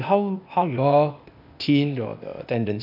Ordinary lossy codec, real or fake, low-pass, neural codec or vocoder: none; fake; 5.4 kHz; codec, 16 kHz, 0.8 kbps, ZipCodec